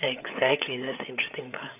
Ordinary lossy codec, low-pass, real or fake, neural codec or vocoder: none; 3.6 kHz; fake; codec, 16 kHz, 8 kbps, FreqCodec, larger model